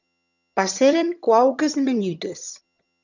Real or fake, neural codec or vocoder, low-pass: fake; vocoder, 22.05 kHz, 80 mel bands, HiFi-GAN; 7.2 kHz